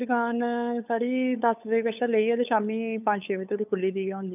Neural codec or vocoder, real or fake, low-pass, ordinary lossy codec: codec, 16 kHz, 8 kbps, FunCodec, trained on LibriTTS, 25 frames a second; fake; 3.6 kHz; none